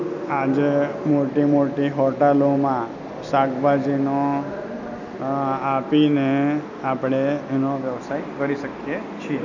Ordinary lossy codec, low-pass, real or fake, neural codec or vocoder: none; 7.2 kHz; real; none